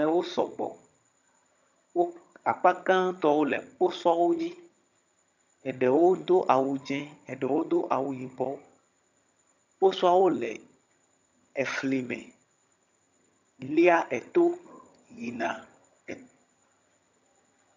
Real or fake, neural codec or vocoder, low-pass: fake; vocoder, 22.05 kHz, 80 mel bands, HiFi-GAN; 7.2 kHz